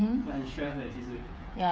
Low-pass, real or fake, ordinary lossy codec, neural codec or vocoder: none; fake; none; codec, 16 kHz, 8 kbps, FreqCodec, smaller model